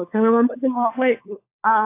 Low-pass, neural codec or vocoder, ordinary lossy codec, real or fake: 3.6 kHz; codec, 16 kHz, 4 kbps, FunCodec, trained on LibriTTS, 50 frames a second; AAC, 24 kbps; fake